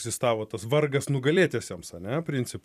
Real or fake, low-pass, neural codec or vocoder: fake; 14.4 kHz; vocoder, 44.1 kHz, 128 mel bands every 256 samples, BigVGAN v2